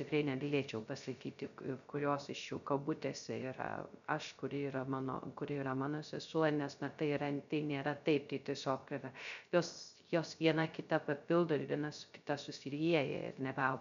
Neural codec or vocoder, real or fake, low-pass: codec, 16 kHz, 0.3 kbps, FocalCodec; fake; 7.2 kHz